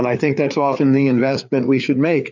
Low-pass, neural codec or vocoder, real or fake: 7.2 kHz; codec, 16 kHz, 4 kbps, FreqCodec, larger model; fake